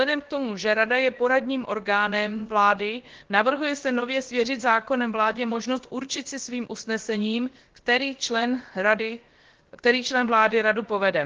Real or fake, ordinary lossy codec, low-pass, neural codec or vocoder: fake; Opus, 16 kbps; 7.2 kHz; codec, 16 kHz, about 1 kbps, DyCAST, with the encoder's durations